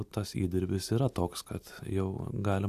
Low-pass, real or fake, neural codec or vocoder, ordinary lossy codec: 14.4 kHz; real; none; AAC, 96 kbps